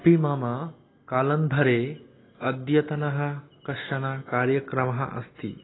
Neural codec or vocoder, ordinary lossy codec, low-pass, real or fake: none; AAC, 16 kbps; 7.2 kHz; real